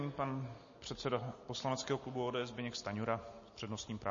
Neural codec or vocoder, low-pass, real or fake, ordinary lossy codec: none; 7.2 kHz; real; MP3, 32 kbps